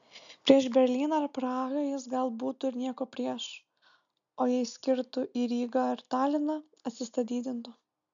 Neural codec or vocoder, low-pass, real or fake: none; 7.2 kHz; real